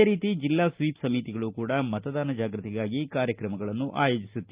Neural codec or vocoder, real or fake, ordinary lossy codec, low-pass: none; real; Opus, 24 kbps; 3.6 kHz